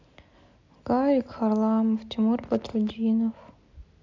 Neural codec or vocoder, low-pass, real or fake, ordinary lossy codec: none; 7.2 kHz; real; AAC, 48 kbps